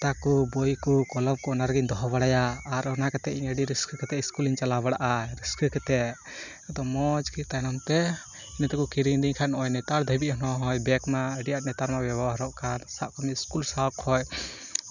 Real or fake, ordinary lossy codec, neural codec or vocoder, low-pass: real; none; none; 7.2 kHz